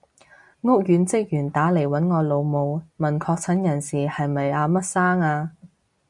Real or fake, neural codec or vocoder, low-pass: real; none; 10.8 kHz